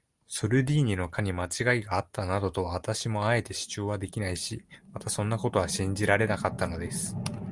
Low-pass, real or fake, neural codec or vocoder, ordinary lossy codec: 10.8 kHz; real; none; Opus, 32 kbps